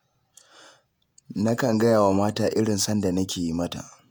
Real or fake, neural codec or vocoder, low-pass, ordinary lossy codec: fake; vocoder, 48 kHz, 128 mel bands, Vocos; none; none